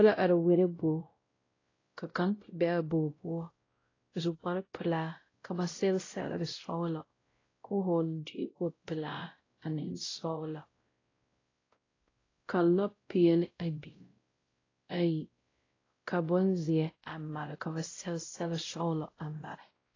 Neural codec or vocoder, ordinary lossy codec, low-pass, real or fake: codec, 16 kHz, 0.5 kbps, X-Codec, WavLM features, trained on Multilingual LibriSpeech; AAC, 32 kbps; 7.2 kHz; fake